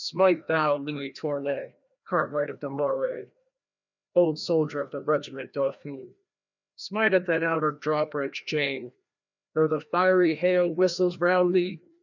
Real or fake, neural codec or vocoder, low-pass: fake; codec, 16 kHz, 1 kbps, FreqCodec, larger model; 7.2 kHz